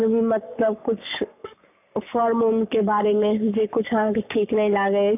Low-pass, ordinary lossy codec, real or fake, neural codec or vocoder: 3.6 kHz; none; fake; codec, 44.1 kHz, 7.8 kbps, Pupu-Codec